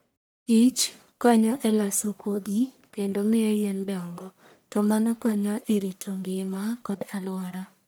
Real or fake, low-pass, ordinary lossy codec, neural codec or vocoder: fake; none; none; codec, 44.1 kHz, 1.7 kbps, Pupu-Codec